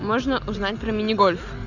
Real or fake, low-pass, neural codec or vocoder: real; 7.2 kHz; none